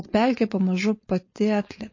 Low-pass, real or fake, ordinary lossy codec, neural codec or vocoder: 7.2 kHz; fake; MP3, 32 kbps; codec, 16 kHz, 4.8 kbps, FACodec